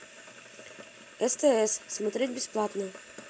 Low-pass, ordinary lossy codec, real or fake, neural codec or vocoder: none; none; real; none